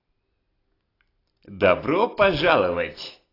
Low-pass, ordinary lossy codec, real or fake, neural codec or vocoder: 5.4 kHz; AAC, 24 kbps; real; none